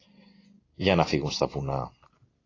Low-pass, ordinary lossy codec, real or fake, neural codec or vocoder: 7.2 kHz; AAC, 32 kbps; real; none